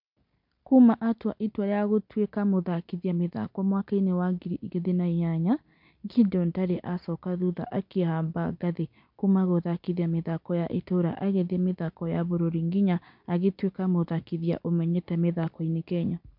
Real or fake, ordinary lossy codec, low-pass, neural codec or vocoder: real; MP3, 48 kbps; 5.4 kHz; none